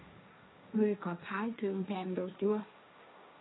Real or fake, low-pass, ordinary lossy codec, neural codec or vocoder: fake; 7.2 kHz; AAC, 16 kbps; codec, 16 kHz, 1.1 kbps, Voila-Tokenizer